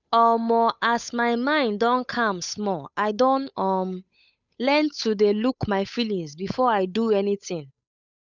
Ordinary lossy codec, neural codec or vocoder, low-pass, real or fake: none; codec, 16 kHz, 8 kbps, FunCodec, trained on Chinese and English, 25 frames a second; 7.2 kHz; fake